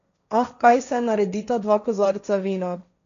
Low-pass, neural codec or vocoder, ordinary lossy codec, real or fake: 7.2 kHz; codec, 16 kHz, 1.1 kbps, Voila-Tokenizer; none; fake